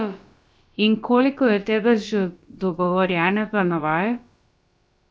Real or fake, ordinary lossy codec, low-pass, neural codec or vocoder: fake; none; none; codec, 16 kHz, about 1 kbps, DyCAST, with the encoder's durations